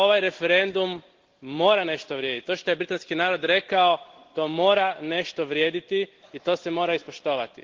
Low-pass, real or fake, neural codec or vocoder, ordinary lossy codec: 7.2 kHz; real; none; Opus, 16 kbps